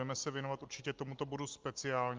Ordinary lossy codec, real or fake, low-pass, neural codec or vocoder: Opus, 32 kbps; real; 7.2 kHz; none